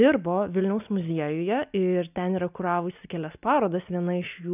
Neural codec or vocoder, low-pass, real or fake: none; 3.6 kHz; real